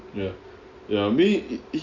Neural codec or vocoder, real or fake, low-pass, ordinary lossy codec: none; real; 7.2 kHz; none